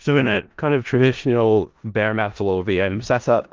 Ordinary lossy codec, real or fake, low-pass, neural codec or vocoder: Opus, 32 kbps; fake; 7.2 kHz; codec, 16 kHz in and 24 kHz out, 0.4 kbps, LongCat-Audio-Codec, four codebook decoder